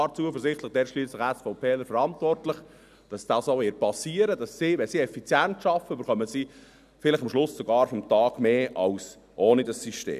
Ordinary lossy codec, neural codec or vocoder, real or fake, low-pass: none; none; real; 14.4 kHz